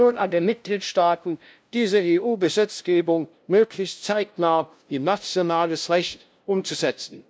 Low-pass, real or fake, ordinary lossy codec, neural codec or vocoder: none; fake; none; codec, 16 kHz, 0.5 kbps, FunCodec, trained on LibriTTS, 25 frames a second